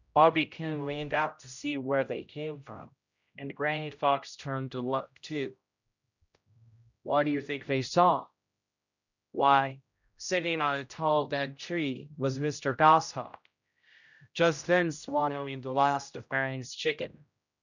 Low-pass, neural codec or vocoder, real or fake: 7.2 kHz; codec, 16 kHz, 0.5 kbps, X-Codec, HuBERT features, trained on general audio; fake